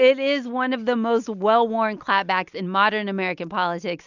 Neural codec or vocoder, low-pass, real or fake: none; 7.2 kHz; real